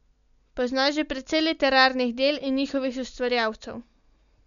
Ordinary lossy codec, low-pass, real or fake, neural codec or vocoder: none; 7.2 kHz; real; none